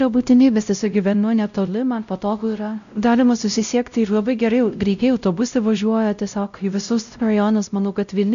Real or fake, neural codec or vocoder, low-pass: fake; codec, 16 kHz, 0.5 kbps, X-Codec, WavLM features, trained on Multilingual LibriSpeech; 7.2 kHz